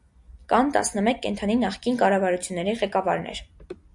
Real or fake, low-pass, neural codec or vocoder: real; 10.8 kHz; none